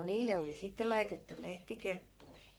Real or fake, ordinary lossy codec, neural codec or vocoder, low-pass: fake; none; codec, 44.1 kHz, 1.7 kbps, Pupu-Codec; none